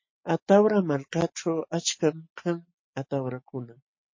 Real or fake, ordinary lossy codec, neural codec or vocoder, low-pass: real; MP3, 32 kbps; none; 7.2 kHz